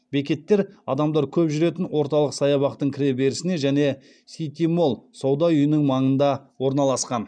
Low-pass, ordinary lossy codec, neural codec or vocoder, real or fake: 9.9 kHz; none; none; real